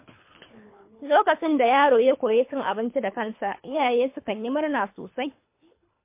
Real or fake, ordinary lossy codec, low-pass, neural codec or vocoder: fake; MP3, 24 kbps; 3.6 kHz; codec, 24 kHz, 3 kbps, HILCodec